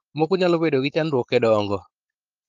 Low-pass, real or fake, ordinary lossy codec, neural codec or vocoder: 7.2 kHz; fake; Opus, 24 kbps; codec, 16 kHz, 4.8 kbps, FACodec